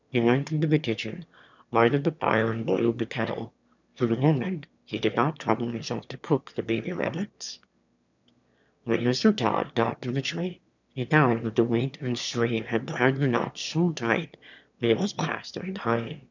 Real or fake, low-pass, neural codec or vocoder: fake; 7.2 kHz; autoencoder, 22.05 kHz, a latent of 192 numbers a frame, VITS, trained on one speaker